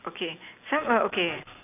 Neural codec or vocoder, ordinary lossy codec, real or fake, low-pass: none; none; real; 3.6 kHz